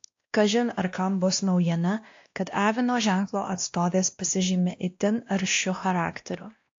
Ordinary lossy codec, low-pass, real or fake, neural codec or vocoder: AAC, 48 kbps; 7.2 kHz; fake; codec, 16 kHz, 1 kbps, X-Codec, WavLM features, trained on Multilingual LibriSpeech